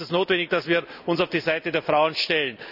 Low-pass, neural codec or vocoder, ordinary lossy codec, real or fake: 5.4 kHz; none; none; real